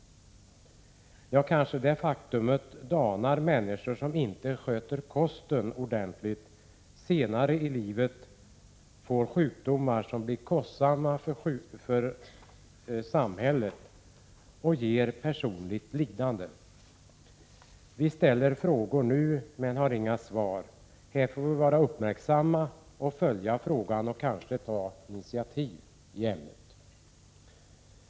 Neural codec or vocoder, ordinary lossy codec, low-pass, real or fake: none; none; none; real